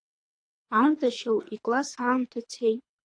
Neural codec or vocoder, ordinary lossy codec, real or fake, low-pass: codec, 24 kHz, 3 kbps, HILCodec; AAC, 48 kbps; fake; 9.9 kHz